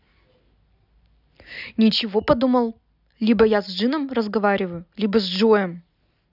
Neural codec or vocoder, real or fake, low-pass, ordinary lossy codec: none; real; 5.4 kHz; none